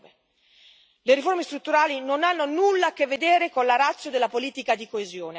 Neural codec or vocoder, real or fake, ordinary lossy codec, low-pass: none; real; none; none